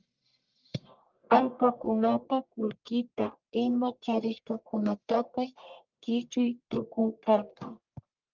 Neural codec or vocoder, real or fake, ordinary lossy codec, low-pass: codec, 44.1 kHz, 1.7 kbps, Pupu-Codec; fake; Opus, 24 kbps; 7.2 kHz